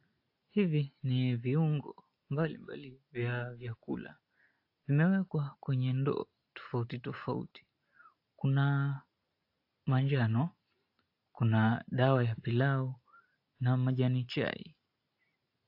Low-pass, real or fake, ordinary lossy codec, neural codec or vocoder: 5.4 kHz; real; AAC, 32 kbps; none